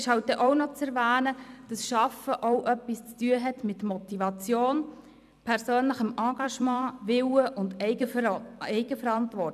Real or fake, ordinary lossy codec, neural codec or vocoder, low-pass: fake; none; vocoder, 44.1 kHz, 128 mel bands every 256 samples, BigVGAN v2; 14.4 kHz